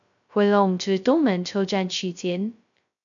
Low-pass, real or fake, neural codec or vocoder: 7.2 kHz; fake; codec, 16 kHz, 0.2 kbps, FocalCodec